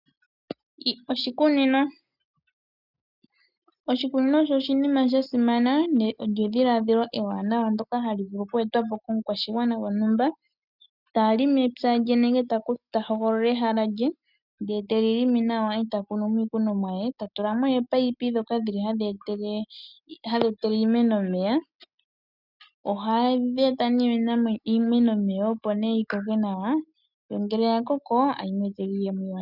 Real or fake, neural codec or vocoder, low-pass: real; none; 5.4 kHz